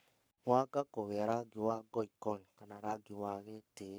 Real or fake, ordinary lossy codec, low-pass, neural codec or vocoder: fake; none; none; codec, 44.1 kHz, 7.8 kbps, Pupu-Codec